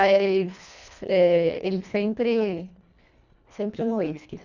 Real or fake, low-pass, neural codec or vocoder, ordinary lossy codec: fake; 7.2 kHz; codec, 24 kHz, 1.5 kbps, HILCodec; none